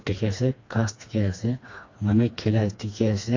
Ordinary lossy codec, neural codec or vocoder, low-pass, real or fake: AAC, 48 kbps; codec, 16 kHz, 2 kbps, FreqCodec, smaller model; 7.2 kHz; fake